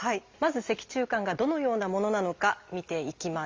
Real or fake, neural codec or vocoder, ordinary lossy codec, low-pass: real; none; Opus, 32 kbps; 7.2 kHz